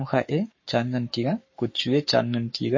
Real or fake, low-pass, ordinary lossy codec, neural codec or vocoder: fake; 7.2 kHz; MP3, 32 kbps; codec, 44.1 kHz, 7.8 kbps, Pupu-Codec